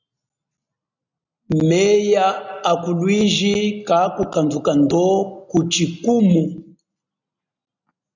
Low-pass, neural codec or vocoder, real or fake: 7.2 kHz; none; real